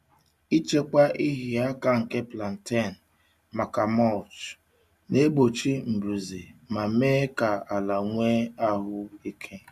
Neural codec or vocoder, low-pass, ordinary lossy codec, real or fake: none; 14.4 kHz; none; real